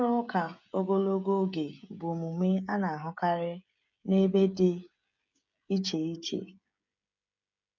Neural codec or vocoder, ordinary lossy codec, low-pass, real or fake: codec, 16 kHz, 16 kbps, FreqCodec, smaller model; none; 7.2 kHz; fake